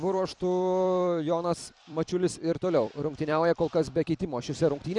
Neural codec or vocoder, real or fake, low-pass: vocoder, 44.1 kHz, 128 mel bands every 256 samples, BigVGAN v2; fake; 10.8 kHz